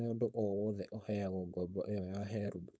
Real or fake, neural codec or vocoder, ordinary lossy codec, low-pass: fake; codec, 16 kHz, 4.8 kbps, FACodec; none; none